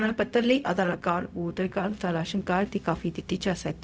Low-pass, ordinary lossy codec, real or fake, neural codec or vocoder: none; none; fake; codec, 16 kHz, 0.4 kbps, LongCat-Audio-Codec